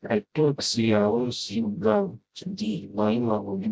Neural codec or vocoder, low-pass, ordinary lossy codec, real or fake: codec, 16 kHz, 0.5 kbps, FreqCodec, smaller model; none; none; fake